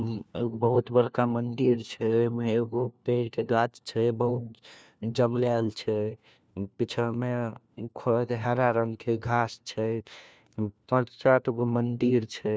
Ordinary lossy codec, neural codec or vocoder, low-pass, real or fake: none; codec, 16 kHz, 1 kbps, FunCodec, trained on LibriTTS, 50 frames a second; none; fake